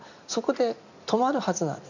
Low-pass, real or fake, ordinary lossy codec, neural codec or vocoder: 7.2 kHz; real; none; none